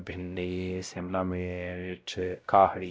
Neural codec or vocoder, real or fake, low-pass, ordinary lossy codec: codec, 16 kHz, 0.5 kbps, X-Codec, WavLM features, trained on Multilingual LibriSpeech; fake; none; none